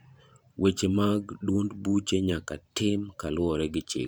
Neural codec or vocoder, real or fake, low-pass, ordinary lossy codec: vocoder, 44.1 kHz, 128 mel bands every 512 samples, BigVGAN v2; fake; none; none